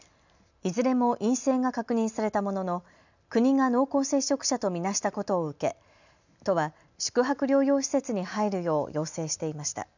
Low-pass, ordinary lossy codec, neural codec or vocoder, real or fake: 7.2 kHz; none; none; real